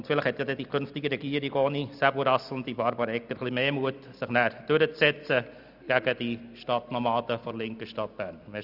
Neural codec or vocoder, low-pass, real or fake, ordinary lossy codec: none; 5.4 kHz; real; none